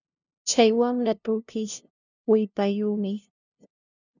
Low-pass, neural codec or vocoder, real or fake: 7.2 kHz; codec, 16 kHz, 0.5 kbps, FunCodec, trained on LibriTTS, 25 frames a second; fake